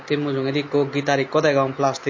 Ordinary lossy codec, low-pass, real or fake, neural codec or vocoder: MP3, 32 kbps; 7.2 kHz; real; none